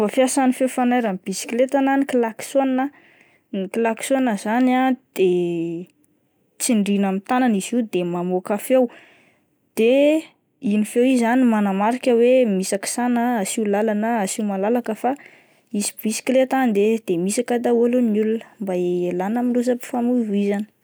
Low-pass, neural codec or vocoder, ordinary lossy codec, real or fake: none; none; none; real